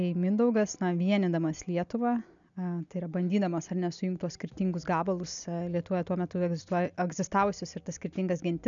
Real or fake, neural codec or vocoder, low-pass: real; none; 7.2 kHz